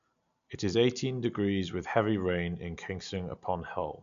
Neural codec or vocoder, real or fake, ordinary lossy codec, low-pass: none; real; none; 7.2 kHz